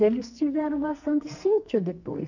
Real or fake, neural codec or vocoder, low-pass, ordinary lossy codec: fake; codec, 44.1 kHz, 2.6 kbps, SNAC; 7.2 kHz; none